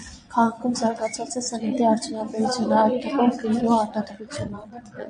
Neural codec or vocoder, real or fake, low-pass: vocoder, 22.05 kHz, 80 mel bands, Vocos; fake; 9.9 kHz